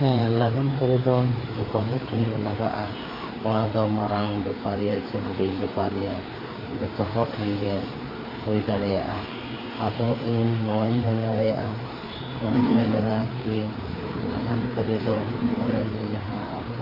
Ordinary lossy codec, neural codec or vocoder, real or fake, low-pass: AAC, 24 kbps; codec, 16 kHz, 4 kbps, FreqCodec, larger model; fake; 5.4 kHz